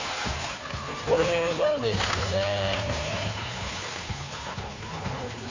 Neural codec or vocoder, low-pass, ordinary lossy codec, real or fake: codec, 16 kHz, 2 kbps, FunCodec, trained on Chinese and English, 25 frames a second; 7.2 kHz; AAC, 32 kbps; fake